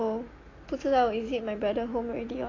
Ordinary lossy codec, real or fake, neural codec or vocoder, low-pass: AAC, 32 kbps; real; none; 7.2 kHz